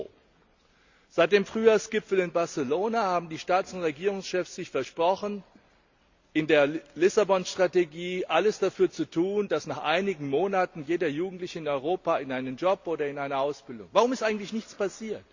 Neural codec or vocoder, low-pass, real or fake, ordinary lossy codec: none; 7.2 kHz; real; Opus, 64 kbps